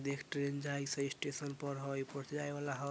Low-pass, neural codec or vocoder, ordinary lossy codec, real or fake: none; none; none; real